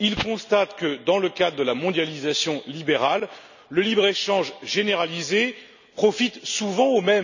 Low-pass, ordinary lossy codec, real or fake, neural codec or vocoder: 7.2 kHz; none; real; none